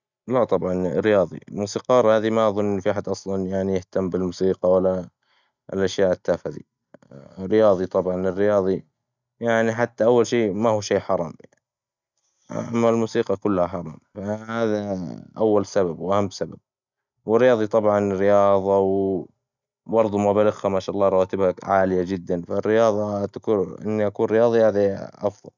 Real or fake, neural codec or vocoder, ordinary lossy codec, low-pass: real; none; none; 7.2 kHz